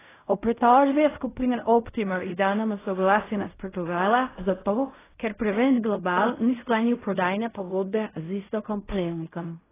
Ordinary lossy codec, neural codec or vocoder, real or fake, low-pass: AAC, 16 kbps; codec, 16 kHz in and 24 kHz out, 0.4 kbps, LongCat-Audio-Codec, fine tuned four codebook decoder; fake; 3.6 kHz